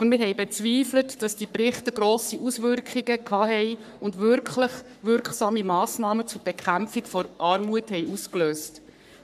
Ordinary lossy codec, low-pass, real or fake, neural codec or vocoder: none; 14.4 kHz; fake; codec, 44.1 kHz, 3.4 kbps, Pupu-Codec